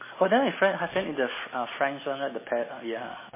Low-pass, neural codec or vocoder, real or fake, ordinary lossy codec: 3.6 kHz; none; real; MP3, 16 kbps